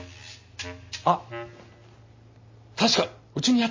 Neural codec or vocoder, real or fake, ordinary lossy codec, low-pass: none; real; MP3, 32 kbps; 7.2 kHz